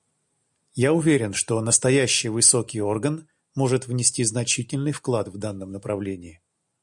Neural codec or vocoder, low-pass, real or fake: none; 10.8 kHz; real